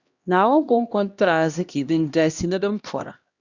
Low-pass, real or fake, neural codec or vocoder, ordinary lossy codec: 7.2 kHz; fake; codec, 16 kHz, 1 kbps, X-Codec, HuBERT features, trained on LibriSpeech; Opus, 64 kbps